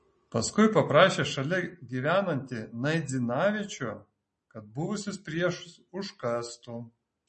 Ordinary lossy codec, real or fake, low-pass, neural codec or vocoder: MP3, 32 kbps; real; 10.8 kHz; none